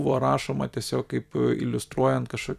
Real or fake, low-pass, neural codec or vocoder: fake; 14.4 kHz; vocoder, 44.1 kHz, 128 mel bands every 256 samples, BigVGAN v2